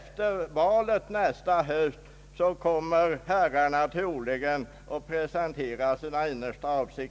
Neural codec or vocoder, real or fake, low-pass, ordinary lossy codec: none; real; none; none